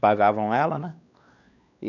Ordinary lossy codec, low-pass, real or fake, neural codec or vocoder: none; 7.2 kHz; fake; codec, 16 kHz, 2 kbps, X-Codec, WavLM features, trained on Multilingual LibriSpeech